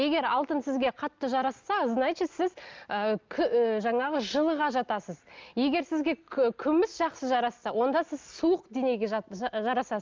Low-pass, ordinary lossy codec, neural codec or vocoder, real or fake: 7.2 kHz; Opus, 24 kbps; none; real